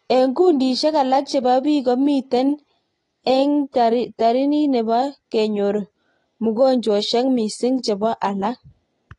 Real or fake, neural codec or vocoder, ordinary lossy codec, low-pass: real; none; AAC, 32 kbps; 10.8 kHz